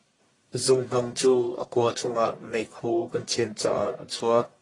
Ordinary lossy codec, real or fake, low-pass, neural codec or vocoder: AAC, 32 kbps; fake; 10.8 kHz; codec, 44.1 kHz, 1.7 kbps, Pupu-Codec